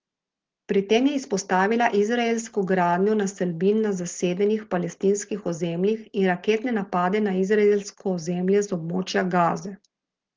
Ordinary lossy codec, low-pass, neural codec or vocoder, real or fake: Opus, 16 kbps; 7.2 kHz; none; real